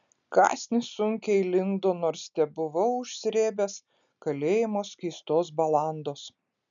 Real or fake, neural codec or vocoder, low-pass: real; none; 7.2 kHz